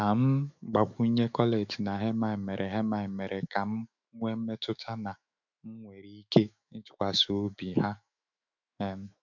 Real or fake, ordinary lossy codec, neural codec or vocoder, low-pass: fake; none; autoencoder, 48 kHz, 128 numbers a frame, DAC-VAE, trained on Japanese speech; 7.2 kHz